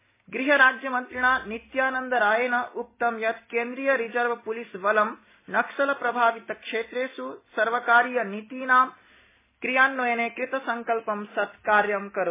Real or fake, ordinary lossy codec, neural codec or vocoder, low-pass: real; MP3, 16 kbps; none; 3.6 kHz